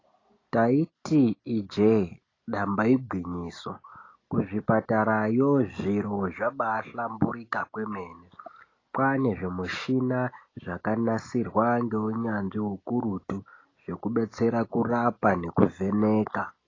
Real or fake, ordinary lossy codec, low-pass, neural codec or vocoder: real; AAC, 32 kbps; 7.2 kHz; none